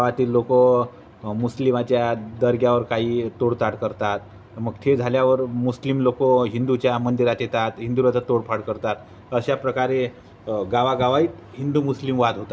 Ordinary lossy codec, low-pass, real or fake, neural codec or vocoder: none; none; real; none